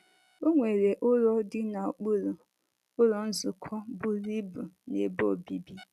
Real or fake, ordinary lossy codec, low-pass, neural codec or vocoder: real; none; 14.4 kHz; none